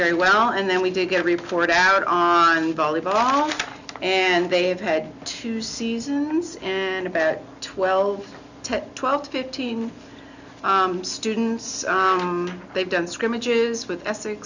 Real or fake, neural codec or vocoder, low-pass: real; none; 7.2 kHz